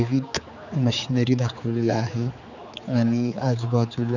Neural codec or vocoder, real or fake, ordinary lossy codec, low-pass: codec, 16 kHz, 4 kbps, X-Codec, HuBERT features, trained on general audio; fake; none; 7.2 kHz